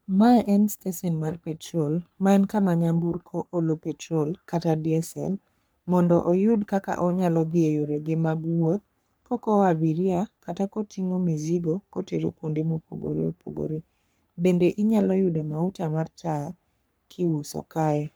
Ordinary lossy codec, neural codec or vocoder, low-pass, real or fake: none; codec, 44.1 kHz, 3.4 kbps, Pupu-Codec; none; fake